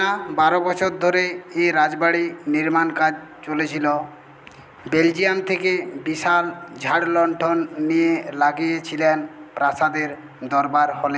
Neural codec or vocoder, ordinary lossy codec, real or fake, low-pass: none; none; real; none